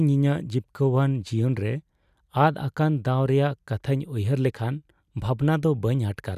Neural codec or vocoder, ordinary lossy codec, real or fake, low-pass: none; none; real; 14.4 kHz